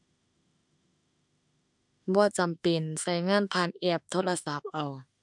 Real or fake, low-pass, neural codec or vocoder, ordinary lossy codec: fake; 10.8 kHz; autoencoder, 48 kHz, 32 numbers a frame, DAC-VAE, trained on Japanese speech; none